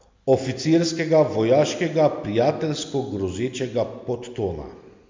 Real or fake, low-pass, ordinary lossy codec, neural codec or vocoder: real; 7.2 kHz; MP3, 64 kbps; none